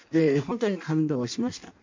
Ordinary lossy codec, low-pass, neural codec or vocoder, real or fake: MP3, 64 kbps; 7.2 kHz; codec, 16 kHz in and 24 kHz out, 0.6 kbps, FireRedTTS-2 codec; fake